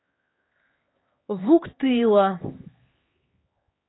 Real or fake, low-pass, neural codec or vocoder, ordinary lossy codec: fake; 7.2 kHz; codec, 16 kHz, 4 kbps, X-Codec, HuBERT features, trained on LibriSpeech; AAC, 16 kbps